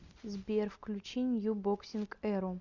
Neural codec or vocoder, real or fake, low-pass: none; real; 7.2 kHz